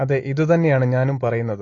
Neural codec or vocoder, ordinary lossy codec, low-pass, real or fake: none; AAC, 32 kbps; 7.2 kHz; real